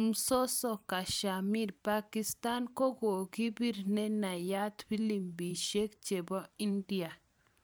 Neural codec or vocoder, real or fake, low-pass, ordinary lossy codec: vocoder, 44.1 kHz, 128 mel bands every 512 samples, BigVGAN v2; fake; none; none